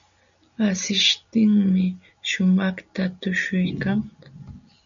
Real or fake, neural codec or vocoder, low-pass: real; none; 7.2 kHz